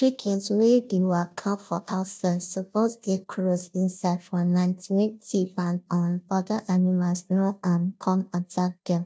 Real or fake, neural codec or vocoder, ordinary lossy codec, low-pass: fake; codec, 16 kHz, 0.5 kbps, FunCodec, trained on Chinese and English, 25 frames a second; none; none